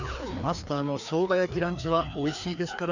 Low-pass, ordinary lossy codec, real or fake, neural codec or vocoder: 7.2 kHz; none; fake; codec, 16 kHz, 2 kbps, FreqCodec, larger model